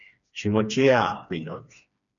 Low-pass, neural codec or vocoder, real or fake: 7.2 kHz; codec, 16 kHz, 2 kbps, FreqCodec, smaller model; fake